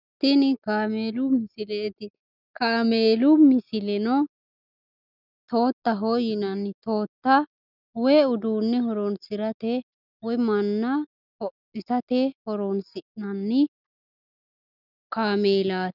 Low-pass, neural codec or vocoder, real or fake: 5.4 kHz; none; real